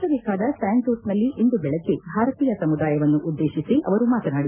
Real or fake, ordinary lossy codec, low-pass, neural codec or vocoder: real; none; 3.6 kHz; none